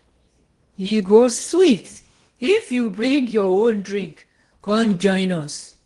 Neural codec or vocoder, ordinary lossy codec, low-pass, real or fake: codec, 16 kHz in and 24 kHz out, 0.8 kbps, FocalCodec, streaming, 65536 codes; Opus, 24 kbps; 10.8 kHz; fake